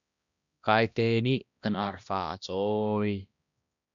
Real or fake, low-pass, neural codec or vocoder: fake; 7.2 kHz; codec, 16 kHz, 1 kbps, X-Codec, HuBERT features, trained on balanced general audio